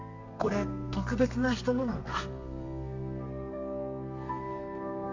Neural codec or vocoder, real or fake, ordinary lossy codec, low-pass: codec, 32 kHz, 1.9 kbps, SNAC; fake; MP3, 48 kbps; 7.2 kHz